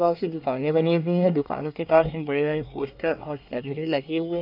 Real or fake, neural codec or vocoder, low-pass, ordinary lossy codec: fake; codec, 24 kHz, 1 kbps, SNAC; 5.4 kHz; none